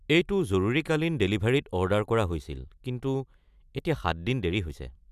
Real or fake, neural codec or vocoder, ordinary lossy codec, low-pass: real; none; none; 14.4 kHz